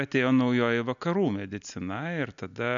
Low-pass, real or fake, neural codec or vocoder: 7.2 kHz; real; none